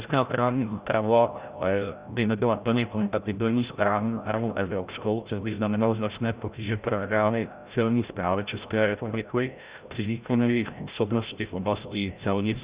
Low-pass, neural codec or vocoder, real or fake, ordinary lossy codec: 3.6 kHz; codec, 16 kHz, 0.5 kbps, FreqCodec, larger model; fake; Opus, 64 kbps